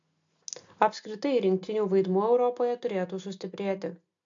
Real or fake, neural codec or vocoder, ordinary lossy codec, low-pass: real; none; AAC, 64 kbps; 7.2 kHz